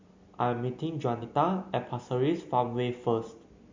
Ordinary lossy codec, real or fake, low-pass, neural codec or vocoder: MP3, 48 kbps; real; 7.2 kHz; none